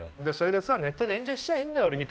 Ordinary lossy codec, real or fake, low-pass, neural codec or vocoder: none; fake; none; codec, 16 kHz, 1 kbps, X-Codec, HuBERT features, trained on balanced general audio